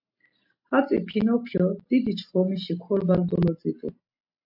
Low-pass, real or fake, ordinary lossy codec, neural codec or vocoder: 5.4 kHz; real; AAC, 48 kbps; none